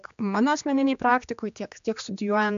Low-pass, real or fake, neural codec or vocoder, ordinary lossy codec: 7.2 kHz; fake; codec, 16 kHz, 2 kbps, X-Codec, HuBERT features, trained on general audio; MP3, 64 kbps